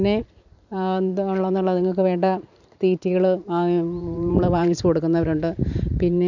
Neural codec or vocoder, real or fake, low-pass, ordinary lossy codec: vocoder, 44.1 kHz, 128 mel bands every 512 samples, BigVGAN v2; fake; 7.2 kHz; none